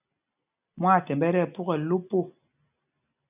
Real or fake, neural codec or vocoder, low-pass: real; none; 3.6 kHz